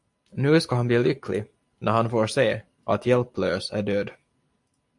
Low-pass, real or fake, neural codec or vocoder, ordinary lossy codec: 10.8 kHz; real; none; AAC, 64 kbps